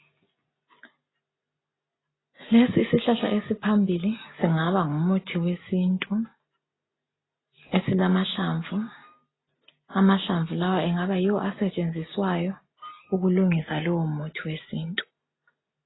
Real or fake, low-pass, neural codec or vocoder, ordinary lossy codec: real; 7.2 kHz; none; AAC, 16 kbps